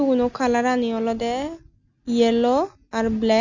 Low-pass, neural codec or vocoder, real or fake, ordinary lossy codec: 7.2 kHz; none; real; none